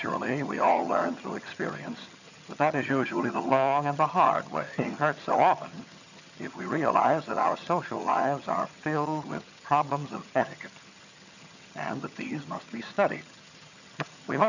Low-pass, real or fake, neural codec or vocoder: 7.2 kHz; fake; vocoder, 22.05 kHz, 80 mel bands, HiFi-GAN